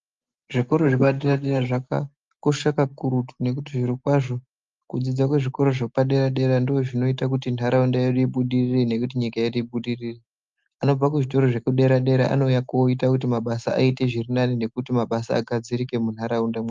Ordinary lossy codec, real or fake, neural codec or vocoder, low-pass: Opus, 32 kbps; real; none; 7.2 kHz